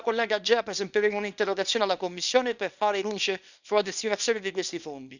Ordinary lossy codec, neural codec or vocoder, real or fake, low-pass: none; codec, 24 kHz, 0.9 kbps, WavTokenizer, small release; fake; 7.2 kHz